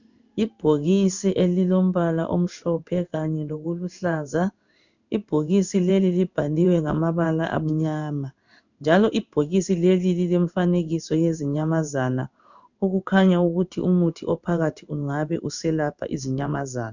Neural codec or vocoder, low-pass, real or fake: codec, 16 kHz in and 24 kHz out, 1 kbps, XY-Tokenizer; 7.2 kHz; fake